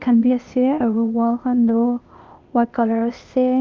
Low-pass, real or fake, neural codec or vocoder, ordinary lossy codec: 7.2 kHz; fake; codec, 16 kHz, 0.8 kbps, ZipCodec; Opus, 24 kbps